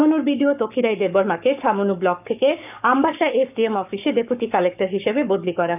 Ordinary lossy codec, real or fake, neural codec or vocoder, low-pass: none; fake; codec, 44.1 kHz, 7.8 kbps, Pupu-Codec; 3.6 kHz